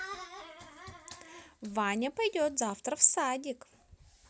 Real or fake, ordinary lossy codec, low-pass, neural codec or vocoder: real; none; none; none